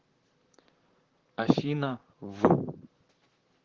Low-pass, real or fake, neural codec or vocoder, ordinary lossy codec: 7.2 kHz; fake; vocoder, 44.1 kHz, 128 mel bands, Pupu-Vocoder; Opus, 16 kbps